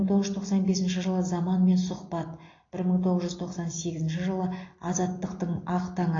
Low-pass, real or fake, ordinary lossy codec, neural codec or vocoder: 7.2 kHz; real; AAC, 32 kbps; none